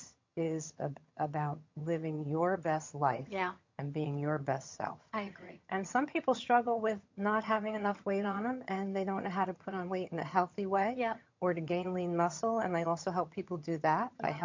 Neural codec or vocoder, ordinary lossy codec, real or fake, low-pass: vocoder, 22.05 kHz, 80 mel bands, HiFi-GAN; MP3, 48 kbps; fake; 7.2 kHz